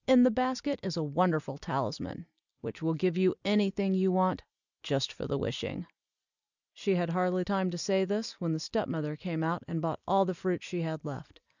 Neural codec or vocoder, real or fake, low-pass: none; real; 7.2 kHz